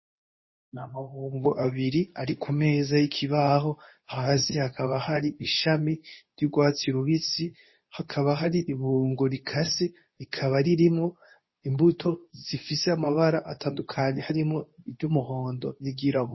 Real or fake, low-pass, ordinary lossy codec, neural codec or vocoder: fake; 7.2 kHz; MP3, 24 kbps; codec, 24 kHz, 0.9 kbps, WavTokenizer, medium speech release version 2